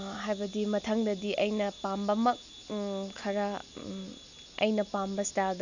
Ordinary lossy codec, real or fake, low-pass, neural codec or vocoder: none; real; 7.2 kHz; none